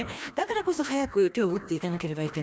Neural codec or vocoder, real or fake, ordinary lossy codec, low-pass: codec, 16 kHz, 1 kbps, FreqCodec, larger model; fake; none; none